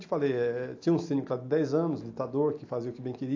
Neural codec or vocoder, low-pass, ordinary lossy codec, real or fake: none; 7.2 kHz; AAC, 48 kbps; real